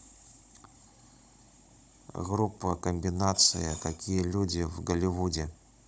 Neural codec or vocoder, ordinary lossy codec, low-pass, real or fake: codec, 16 kHz, 16 kbps, FunCodec, trained on Chinese and English, 50 frames a second; none; none; fake